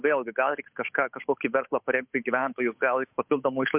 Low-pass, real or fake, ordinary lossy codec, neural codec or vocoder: 3.6 kHz; fake; MP3, 32 kbps; codec, 16 kHz, 8 kbps, FunCodec, trained on Chinese and English, 25 frames a second